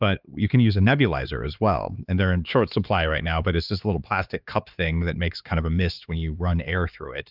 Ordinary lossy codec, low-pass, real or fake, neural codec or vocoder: Opus, 32 kbps; 5.4 kHz; fake; codec, 16 kHz, 4 kbps, X-Codec, HuBERT features, trained on LibriSpeech